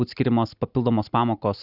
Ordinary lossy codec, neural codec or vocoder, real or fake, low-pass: Opus, 64 kbps; none; real; 5.4 kHz